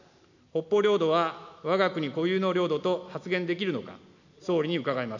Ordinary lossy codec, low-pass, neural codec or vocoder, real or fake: none; 7.2 kHz; none; real